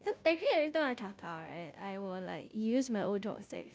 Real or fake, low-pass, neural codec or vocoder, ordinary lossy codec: fake; none; codec, 16 kHz, 0.5 kbps, FunCodec, trained on Chinese and English, 25 frames a second; none